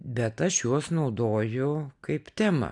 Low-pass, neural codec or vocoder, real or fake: 10.8 kHz; none; real